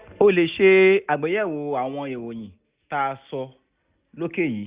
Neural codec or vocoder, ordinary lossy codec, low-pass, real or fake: none; Opus, 64 kbps; 3.6 kHz; real